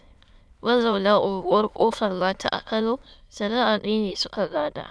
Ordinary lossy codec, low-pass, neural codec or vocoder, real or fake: none; none; autoencoder, 22.05 kHz, a latent of 192 numbers a frame, VITS, trained on many speakers; fake